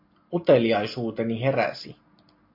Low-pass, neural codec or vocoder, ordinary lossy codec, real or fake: 5.4 kHz; none; MP3, 32 kbps; real